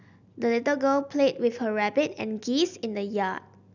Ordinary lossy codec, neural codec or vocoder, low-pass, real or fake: none; none; 7.2 kHz; real